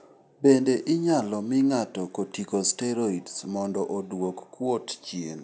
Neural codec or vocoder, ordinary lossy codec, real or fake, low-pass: none; none; real; none